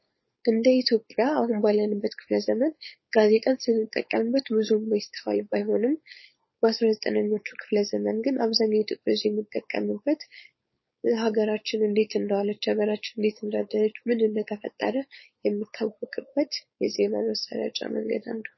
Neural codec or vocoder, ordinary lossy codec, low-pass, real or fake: codec, 16 kHz, 4.8 kbps, FACodec; MP3, 24 kbps; 7.2 kHz; fake